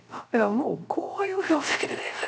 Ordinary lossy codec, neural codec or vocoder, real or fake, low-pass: none; codec, 16 kHz, 0.3 kbps, FocalCodec; fake; none